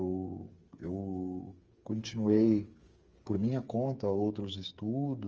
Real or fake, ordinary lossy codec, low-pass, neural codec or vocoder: fake; Opus, 24 kbps; 7.2 kHz; codec, 16 kHz, 8 kbps, FreqCodec, smaller model